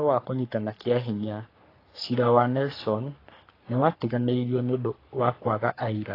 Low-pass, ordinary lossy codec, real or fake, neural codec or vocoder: 5.4 kHz; AAC, 24 kbps; fake; codec, 44.1 kHz, 3.4 kbps, Pupu-Codec